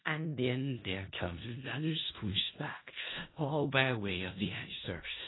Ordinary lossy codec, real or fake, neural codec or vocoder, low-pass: AAC, 16 kbps; fake; codec, 16 kHz in and 24 kHz out, 0.4 kbps, LongCat-Audio-Codec, four codebook decoder; 7.2 kHz